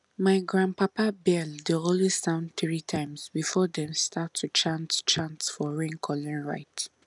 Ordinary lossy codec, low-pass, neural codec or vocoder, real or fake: none; 10.8 kHz; none; real